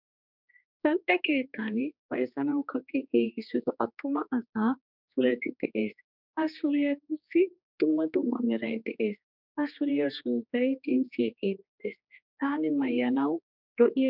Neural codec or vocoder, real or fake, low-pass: codec, 16 kHz, 2 kbps, X-Codec, HuBERT features, trained on general audio; fake; 5.4 kHz